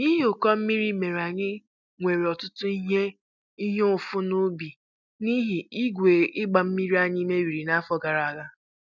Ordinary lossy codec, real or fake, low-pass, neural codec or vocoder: none; real; 7.2 kHz; none